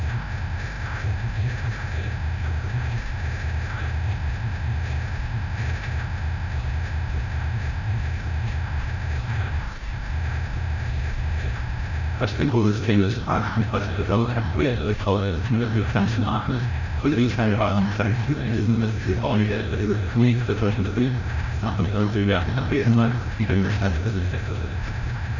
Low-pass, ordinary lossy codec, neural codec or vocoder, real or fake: 7.2 kHz; none; codec, 16 kHz, 0.5 kbps, FreqCodec, larger model; fake